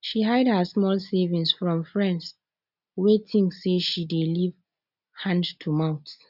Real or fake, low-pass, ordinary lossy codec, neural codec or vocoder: real; 5.4 kHz; none; none